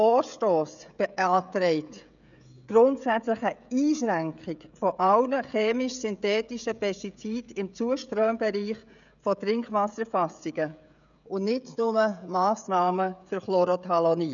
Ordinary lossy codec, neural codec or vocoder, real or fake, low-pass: none; codec, 16 kHz, 16 kbps, FreqCodec, smaller model; fake; 7.2 kHz